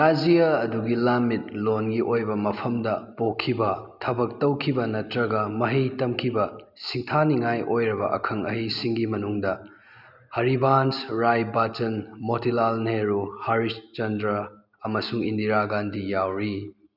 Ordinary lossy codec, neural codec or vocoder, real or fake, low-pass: none; none; real; 5.4 kHz